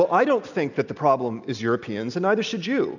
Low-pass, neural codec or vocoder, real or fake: 7.2 kHz; none; real